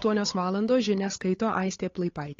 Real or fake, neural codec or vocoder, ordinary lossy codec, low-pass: fake; codec, 16 kHz, 8 kbps, FunCodec, trained on Chinese and English, 25 frames a second; AAC, 32 kbps; 7.2 kHz